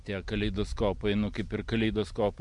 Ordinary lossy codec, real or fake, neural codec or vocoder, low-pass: MP3, 64 kbps; real; none; 10.8 kHz